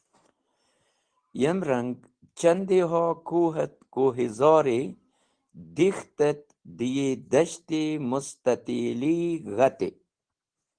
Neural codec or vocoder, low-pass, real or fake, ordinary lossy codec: none; 9.9 kHz; real; Opus, 16 kbps